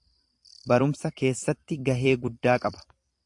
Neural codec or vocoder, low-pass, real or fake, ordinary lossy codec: vocoder, 44.1 kHz, 128 mel bands every 256 samples, BigVGAN v2; 10.8 kHz; fake; AAC, 64 kbps